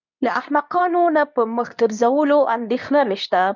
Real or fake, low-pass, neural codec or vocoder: fake; 7.2 kHz; codec, 24 kHz, 0.9 kbps, WavTokenizer, medium speech release version 2